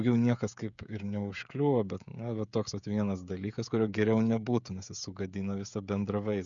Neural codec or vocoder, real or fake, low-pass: codec, 16 kHz, 16 kbps, FreqCodec, smaller model; fake; 7.2 kHz